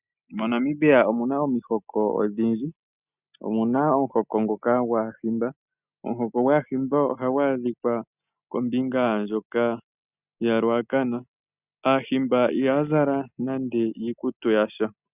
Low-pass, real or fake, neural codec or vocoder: 3.6 kHz; real; none